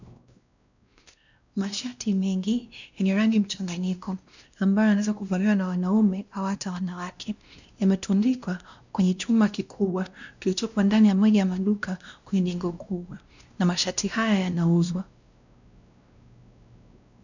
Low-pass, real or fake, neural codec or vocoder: 7.2 kHz; fake; codec, 16 kHz, 1 kbps, X-Codec, WavLM features, trained on Multilingual LibriSpeech